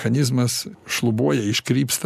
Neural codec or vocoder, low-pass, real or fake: none; 14.4 kHz; real